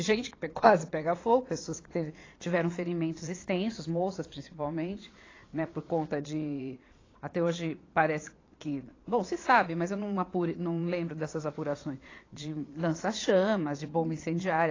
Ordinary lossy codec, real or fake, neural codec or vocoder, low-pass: AAC, 32 kbps; fake; vocoder, 22.05 kHz, 80 mel bands, Vocos; 7.2 kHz